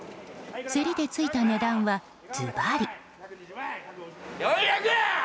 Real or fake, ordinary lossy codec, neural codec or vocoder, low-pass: real; none; none; none